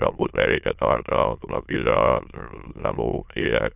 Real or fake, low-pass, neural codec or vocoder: fake; 3.6 kHz; autoencoder, 22.05 kHz, a latent of 192 numbers a frame, VITS, trained on many speakers